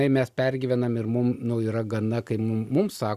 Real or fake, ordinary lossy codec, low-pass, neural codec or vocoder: real; Opus, 64 kbps; 14.4 kHz; none